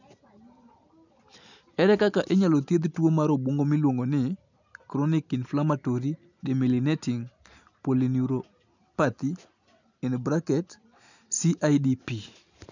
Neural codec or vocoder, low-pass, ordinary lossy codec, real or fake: none; 7.2 kHz; none; real